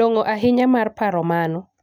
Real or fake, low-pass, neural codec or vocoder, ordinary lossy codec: real; 19.8 kHz; none; none